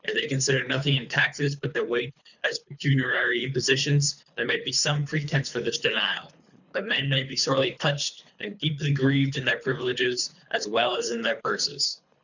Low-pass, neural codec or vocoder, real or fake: 7.2 kHz; codec, 24 kHz, 3 kbps, HILCodec; fake